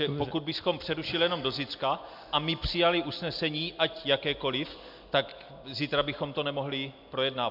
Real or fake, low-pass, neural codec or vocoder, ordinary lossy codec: real; 5.4 kHz; none; MP3, 48 kbps